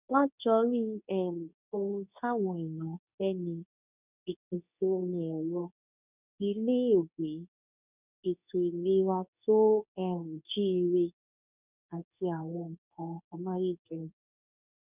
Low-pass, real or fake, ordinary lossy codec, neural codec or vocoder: 3.6 kHz; fake; none; codec, 24 kHz, 0.9 kbps, WavTokenizer, medium speech release version 2